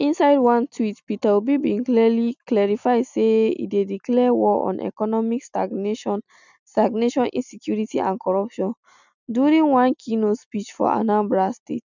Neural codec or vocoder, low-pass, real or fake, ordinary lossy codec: none; 7.2 kHz; real; none